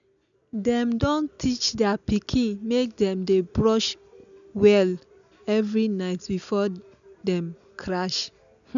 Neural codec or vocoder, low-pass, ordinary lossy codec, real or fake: none; 7.2 kHz; none; real